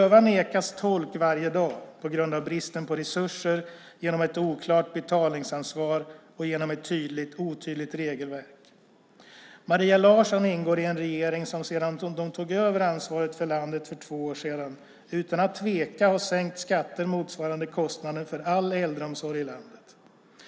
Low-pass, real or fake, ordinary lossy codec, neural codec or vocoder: none; real; none; none